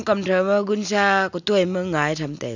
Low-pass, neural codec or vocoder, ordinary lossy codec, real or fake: 7.2 kHz; none; none; real